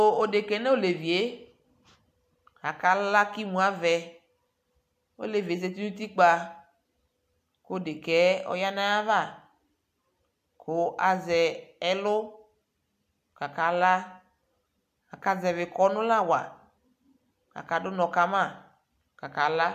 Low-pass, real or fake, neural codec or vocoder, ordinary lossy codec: 14.4 kHz; real; none; AAC, 96 kbps